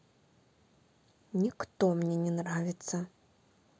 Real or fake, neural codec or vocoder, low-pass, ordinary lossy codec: real; none; none; none